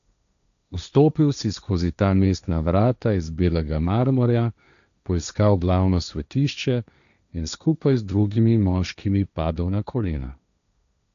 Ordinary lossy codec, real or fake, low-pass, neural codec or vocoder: none; fake; 7.2 kHz; codec, 16 kHz, 1.1 kbps, Voila-Tokenizer